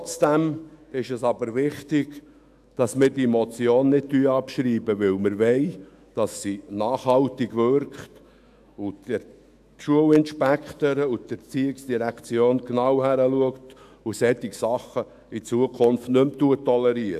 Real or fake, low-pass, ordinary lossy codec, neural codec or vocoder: fake; 14.4 kHz; AAC, 96 kbps; autoencoder, 48 kHz, 128 numbers a frame, DAC-VAE, trained on Japanese speech